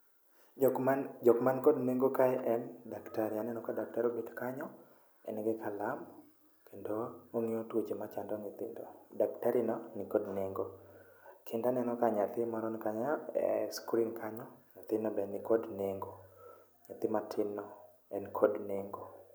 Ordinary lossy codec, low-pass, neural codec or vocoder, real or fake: none; none; none; real